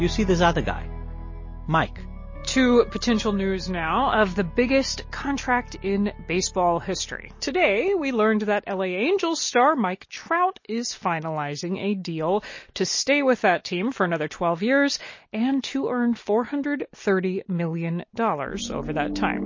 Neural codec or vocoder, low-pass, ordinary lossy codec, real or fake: none; 7.2 kHz; MP3, 32 kbps; real